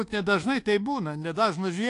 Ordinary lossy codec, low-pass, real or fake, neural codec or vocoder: AAC, 48 kbps; 10.8 kHz; fake; codec, 24 kHz, 1.2 kbps, DualCodec